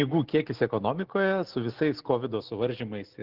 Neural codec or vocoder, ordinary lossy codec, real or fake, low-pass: none; Opus, 16 kbps; real; 5.4 kHz